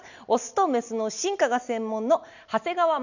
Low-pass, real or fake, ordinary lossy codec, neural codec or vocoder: 7.2 kHz; real; none; none